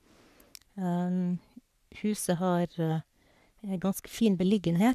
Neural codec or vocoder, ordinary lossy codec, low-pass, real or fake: codec, 44.1 kHz, 3.4 kbps, Pupu-Codec; none; 14.4 kHz; fake